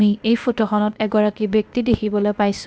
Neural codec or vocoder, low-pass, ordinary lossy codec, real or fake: codec, 16 kHz, 0.8 kbps, ZipCodec; none; none; fake